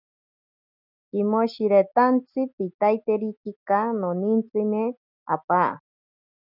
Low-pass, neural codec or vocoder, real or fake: 5.4 kHz; none; real